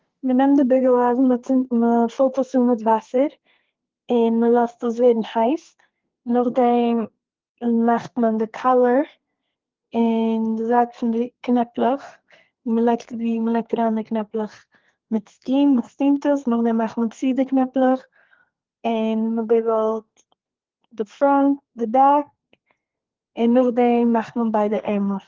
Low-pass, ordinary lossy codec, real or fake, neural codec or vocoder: 7.2 kHz; Opus, 16 kbps; fake; codec, 32 kHz, 1.9 kbps, SNAC